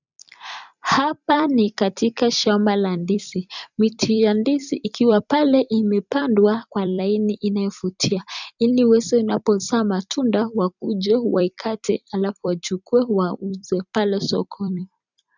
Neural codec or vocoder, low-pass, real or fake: vocoder, 44.1 kHz, 128 mel bands every 256 samples, BigVGAN v2; 7.2 kHz; fake